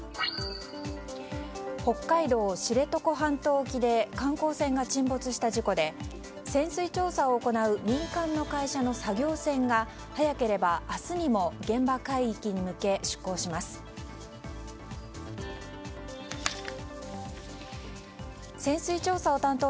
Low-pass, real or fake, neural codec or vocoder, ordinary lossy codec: none; real; none; none